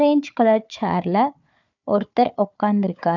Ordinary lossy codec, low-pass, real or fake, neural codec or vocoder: none; 7.2 kHz; fake; codec, 16 kHz, 4 kbps, FunCodec, trained on Chinese and English, 50 frames a second